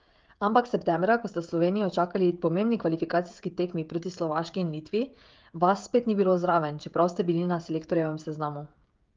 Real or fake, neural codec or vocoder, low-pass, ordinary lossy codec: fake; codec, 16 kHz, 16 kbps, FreqCodec, smaller model; 7.2 kHz; Opus, 32 kbps